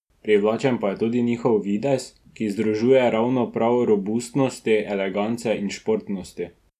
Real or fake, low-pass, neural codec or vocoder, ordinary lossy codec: real; 14.4 kHz; none; none